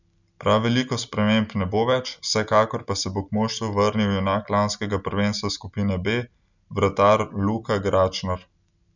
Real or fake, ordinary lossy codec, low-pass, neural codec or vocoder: real; none; 7.2 kHz; none